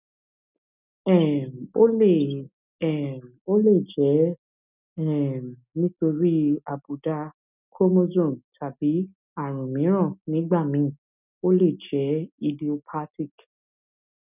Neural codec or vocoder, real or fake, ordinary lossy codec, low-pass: none; real; none; 3.6 kHz